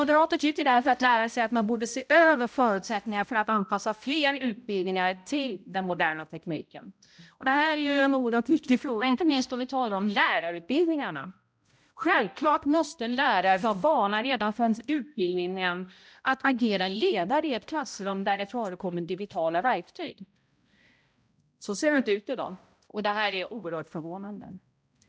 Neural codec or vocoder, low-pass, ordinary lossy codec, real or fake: codec, 16 kHz, 0.5 kbps, X-Codec, HuBERT features, trained on balanced general audio; none; none; fake